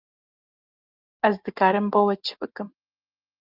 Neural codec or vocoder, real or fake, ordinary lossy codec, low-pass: none; real; Opus, 32 kbps; 5.4 kHz